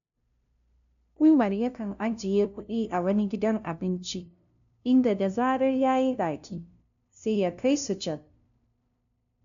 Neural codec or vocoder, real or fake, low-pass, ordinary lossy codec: codec, 16 kHz, 0.5 kbps, FunCodec, trained on LibriTTS, 25 frames a second; fake; 7.2 kHz; none